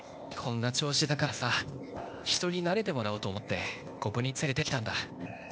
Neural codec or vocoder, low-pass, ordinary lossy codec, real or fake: codec, 16 kHz, 0.8 kbps, ZipCodec; none; none; fake